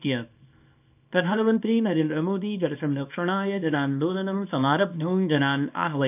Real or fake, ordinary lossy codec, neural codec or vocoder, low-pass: fake; none; codec, 24 kHz, 0.9 kbps, WavTokenizer, small release; 3.6 kHz